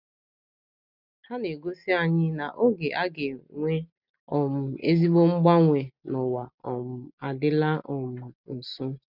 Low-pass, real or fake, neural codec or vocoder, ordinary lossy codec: 5.4 kHz; real; none; none